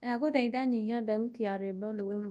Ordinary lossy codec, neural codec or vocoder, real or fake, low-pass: none; codec, 24 kHz, 0.9 kbps, WavTokenizer, large speech release; fake; none